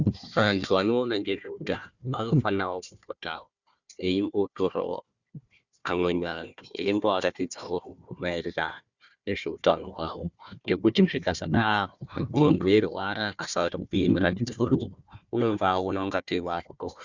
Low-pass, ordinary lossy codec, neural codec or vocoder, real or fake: 7.2 kHz; Opus, 64 kbps; codec, 16 kHz, 1 kbps, FunCodec, trained on Chinese and English, 50 frames a second; fake